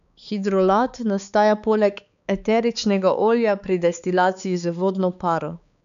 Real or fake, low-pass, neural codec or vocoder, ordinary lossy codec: fake; 7.2 kHz; codec, 16 kHz, 4 kbps, X-Codec, HuBERT features, trained on balanced general audio; none